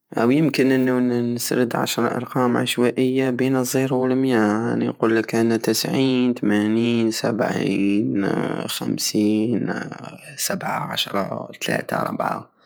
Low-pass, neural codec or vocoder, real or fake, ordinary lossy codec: none; vocoder, 48 kHz, 128 mel bands, Vocos; fake; none